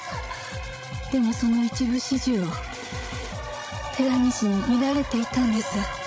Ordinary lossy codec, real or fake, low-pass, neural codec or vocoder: none; fake; none; codec, 16 kHz, 16 kbps, FreqCodec, larger model